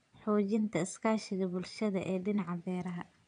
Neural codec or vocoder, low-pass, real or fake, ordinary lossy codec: vocoder, 22.05 kHz, 80 mel bands, Vocos; 9.9 kHz; fake; AAC, 96 kbps